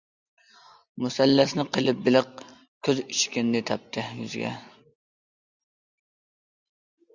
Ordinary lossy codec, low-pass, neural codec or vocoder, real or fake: Opus, 64 kbps; 7.2 kHz; none; real